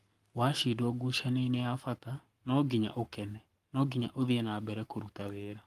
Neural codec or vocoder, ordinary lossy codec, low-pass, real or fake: codec, 44.1 kHz, 7.8 kbps, Pupu-Codec; Opus, 32 kbps; 14.4 kHz; fake